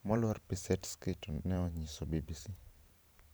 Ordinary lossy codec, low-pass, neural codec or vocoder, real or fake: none; none; vocoder, 44.1 kHz, 128 mel bands every 512 samples, BigVGAN v2; fake